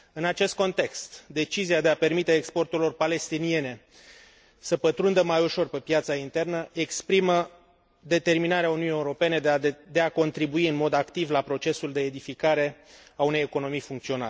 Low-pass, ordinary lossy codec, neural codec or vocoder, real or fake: none; none; none; real